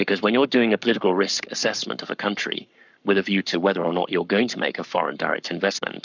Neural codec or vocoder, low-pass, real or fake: codec, 44.1 kHz, 7.8 kbps, Pupu-Codec; 7.2 kHz; fake